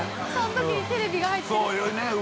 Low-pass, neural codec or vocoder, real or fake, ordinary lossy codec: none; none; real; none